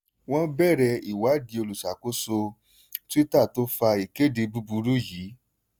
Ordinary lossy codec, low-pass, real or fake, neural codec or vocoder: none; none; real; none